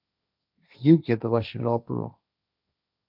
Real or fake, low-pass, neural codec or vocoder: fake; 5.4 kHz; codec, 16 kHz, 1.1 kbps, Voila-Tokenizer